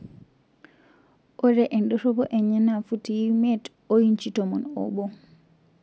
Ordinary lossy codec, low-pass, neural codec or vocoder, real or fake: none; none; none; real